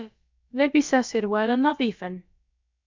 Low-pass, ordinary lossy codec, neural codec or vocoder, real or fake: 7.2 kHz; MP3, 64 kbps; codec, 16 kHz, about 1 kbps, DyCAST, with the encoder's durations; fake